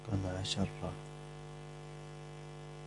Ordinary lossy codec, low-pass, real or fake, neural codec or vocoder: AAC, 48 kbps; 10.8 kHz; real; none